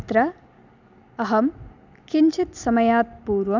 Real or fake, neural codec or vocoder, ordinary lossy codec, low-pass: real; none; none; 7.2 kHz